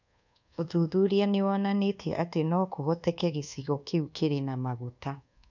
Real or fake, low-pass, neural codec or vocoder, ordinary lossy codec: fake; 7.2 kHz; codec, 24 kHz, 1.2 kbps, DualCodec; none